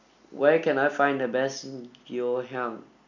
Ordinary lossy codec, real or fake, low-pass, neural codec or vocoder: none; real; 7.2 kHz; none